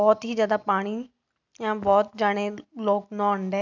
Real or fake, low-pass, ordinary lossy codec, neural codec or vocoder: real; 7.2 kHz; none; none